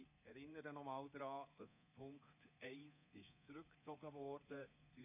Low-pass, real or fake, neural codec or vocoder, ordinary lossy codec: 3.6 kHz; fake; codec, 24 kHz, 3.1 kbps, DualCodec; none